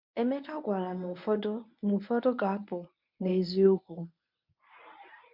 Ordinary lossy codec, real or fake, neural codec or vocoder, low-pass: MP3, 48 kbps; fake; codec, 24 kHz, 0.9 kbps, WavTokenizer, medium speech release version 1; 5.4 kHz